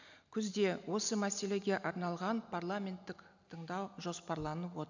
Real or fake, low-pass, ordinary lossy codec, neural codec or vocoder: real; 7.2 kHz; none; none